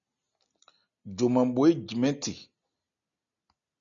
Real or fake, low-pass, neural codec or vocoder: real; 7.2 kHz; none